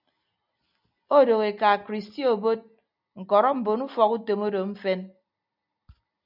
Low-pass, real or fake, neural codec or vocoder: 5.4 kHz; real; none